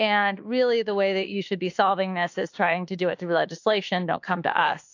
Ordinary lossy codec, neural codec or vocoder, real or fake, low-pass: AAC, 48 kbps; autoencoder, 48 kHz, 32 numbers a frame, DAC-VAE, trained on Japanese speech; fake; 7.2 kHz